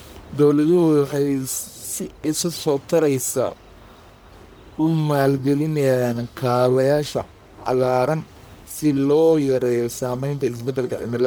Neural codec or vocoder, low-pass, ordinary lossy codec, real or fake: codec, 44.1 kHz, 1.7 kbps, Pupu-Codec; none; none; fake